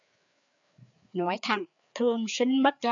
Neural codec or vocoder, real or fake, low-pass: codec, 16 kHz, 4 kbps, FreqCodec, larger model; fake; 7.2 kHz